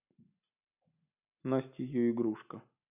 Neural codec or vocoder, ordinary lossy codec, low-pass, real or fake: none; none; 3.6 kHz; real